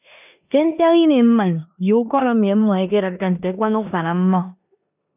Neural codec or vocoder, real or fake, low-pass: codec, 16 kHz in and 24 kHz out, 0.9 kbps, LongCat-Audio-Codec, four codebook decoder; fake; 3.6 kHz